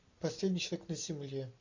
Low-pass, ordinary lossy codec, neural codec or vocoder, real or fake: 7.2 kHz; AAC, 48 kbps; none; real